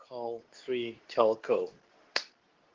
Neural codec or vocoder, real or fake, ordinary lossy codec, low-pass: codec, 24 kHz, 0.9 kbps, WavTokenizer, medium speech release version 2; fake; Opus, 16 kbps; 7.2 kHz